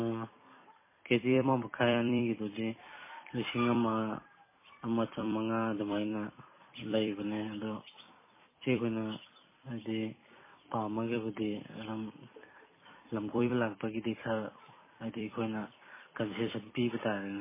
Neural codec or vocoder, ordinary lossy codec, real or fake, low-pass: vocoder, 44.1 kHz, 128 mel bands every 256 samples, BigVGAN v2; MP3, 16 kbps; fake; 3.6 kHz